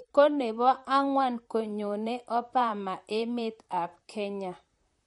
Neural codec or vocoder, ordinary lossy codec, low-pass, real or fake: vocoder, 44.1 kHz, 128 mel bands, Pupu-Vocoder; MP3, 48 kbps; 19.8 kHz; fake